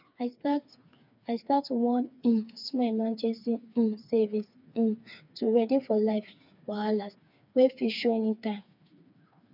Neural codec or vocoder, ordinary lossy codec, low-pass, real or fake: codec, 16 kHz, 4 kbps, FreqCodec, smaller model; none; 5.4 kHz; fake